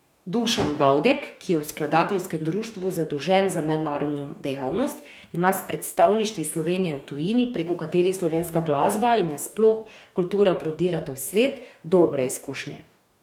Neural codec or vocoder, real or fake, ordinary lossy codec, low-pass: codec, 44.1 kHz, 2.6 kbps, DAC; fake; none; 19.8 kHz